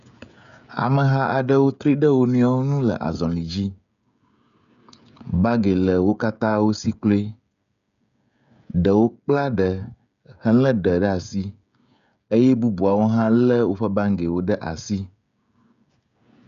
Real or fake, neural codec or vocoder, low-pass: fake; codec, 16 kHz, 16 kbps, FreqCodec, smaller model; 7.2 kHz